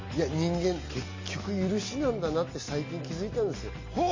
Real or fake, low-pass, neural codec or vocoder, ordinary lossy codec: real; 7.2 kHz; none; MP3, 32 kbps